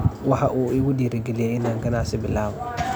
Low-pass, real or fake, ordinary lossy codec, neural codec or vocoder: none; real; none; none